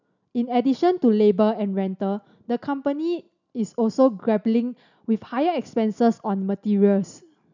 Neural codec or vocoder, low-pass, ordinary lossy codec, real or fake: none; 7.2 kHz; none; real